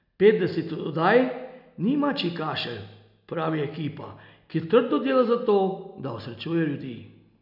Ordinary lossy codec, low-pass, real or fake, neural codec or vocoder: none; 5.4 kHz; real; none